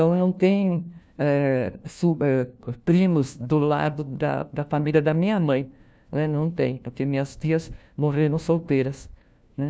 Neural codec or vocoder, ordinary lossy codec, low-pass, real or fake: codec, 16 kHz, 1 kbps, FunCodec, trained on LibriTTS, 50 frames a second; none; none; fake